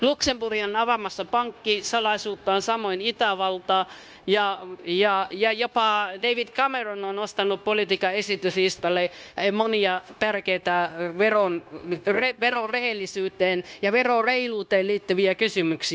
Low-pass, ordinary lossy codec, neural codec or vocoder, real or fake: none; none; codec, 16 kHz, 0.9 kbps, LongCat-Audio-Codec; fake